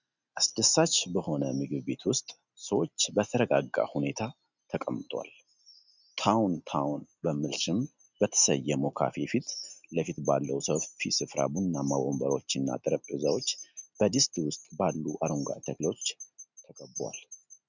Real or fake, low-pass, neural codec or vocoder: real; 7.2 kHz; none